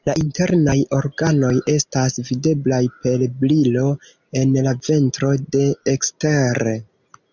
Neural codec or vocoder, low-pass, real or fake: none; 7.2 kHz; real